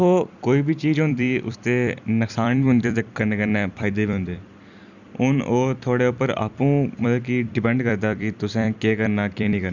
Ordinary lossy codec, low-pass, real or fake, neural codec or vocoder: none; 7.2 kHz; fake; vocoder, 44.1 kHz, 128 mel bands every 256 samples, BigVGAN v2